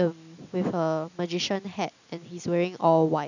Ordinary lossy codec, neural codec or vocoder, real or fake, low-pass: none; none; real; 7.2 kHz